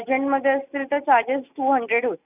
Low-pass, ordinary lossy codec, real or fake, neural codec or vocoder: 3.6 kHz; none; real; none